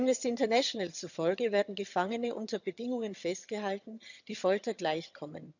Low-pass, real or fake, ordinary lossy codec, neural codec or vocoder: 7.2 kHz; fake; none; vocoder, 22.05 kHz, 80 mel bands, HiFi-GAN